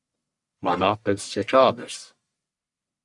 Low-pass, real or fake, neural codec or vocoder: 10.8 kHz; fake; codec, 44.1 kHz, 1.7 kbps, Pupu-Codec